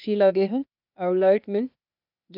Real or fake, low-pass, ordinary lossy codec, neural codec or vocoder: fake; 5.4 kHz; none; codec, 16 kHz, 0.8 kbps, ZipCodec